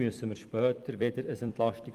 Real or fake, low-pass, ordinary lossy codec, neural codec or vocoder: fake; 14.4 kHz; Opus, 32 kbps; vocoder, 44.1 kHz, 128 mel bands every 256 samples, BigVGAN v2